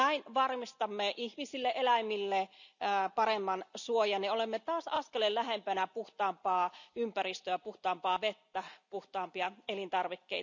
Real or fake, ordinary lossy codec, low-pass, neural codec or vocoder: real; none; 7.2 kHz; none